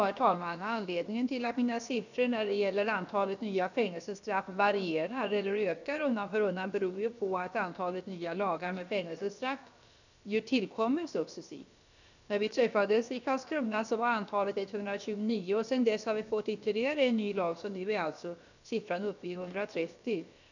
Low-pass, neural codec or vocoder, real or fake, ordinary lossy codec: 7.2 kHz; codec, 16 kHz, about 1 kbps, DyCAST, with the encoder's durations; fake; none